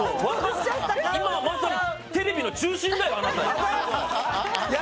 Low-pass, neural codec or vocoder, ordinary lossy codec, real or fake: none; none; none; real